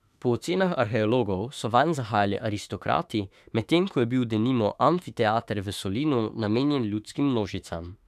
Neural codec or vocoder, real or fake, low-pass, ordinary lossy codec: autoencoder, 48 kHz, 32 numbers a frame, DAC-VAE, trained on Japanese speech; fake; 14.4 kHz; none